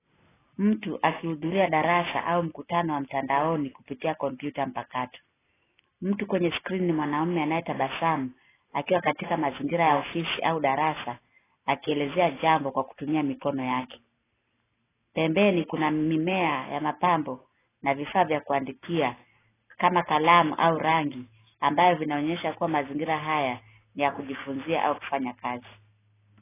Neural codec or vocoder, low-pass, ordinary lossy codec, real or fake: none; 3.6 kHz; AAC, 16 kbps; real